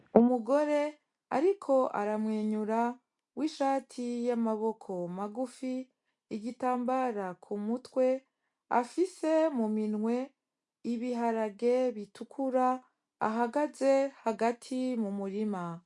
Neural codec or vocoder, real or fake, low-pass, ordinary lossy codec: none; real; 10.8 kHz; MP3, 64 kbps